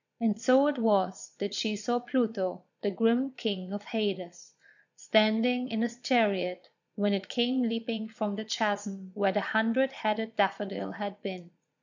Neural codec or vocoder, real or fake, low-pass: vocoder, 22.05 kHz, 80 mel bands, Vocos; fake; 7.2 kHz